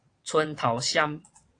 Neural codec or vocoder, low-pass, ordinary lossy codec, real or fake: vocoder, 22.05 kHz, 80 mel bands, WaveNeXt; 9.9 kHz; AAC, 48 kbps; fake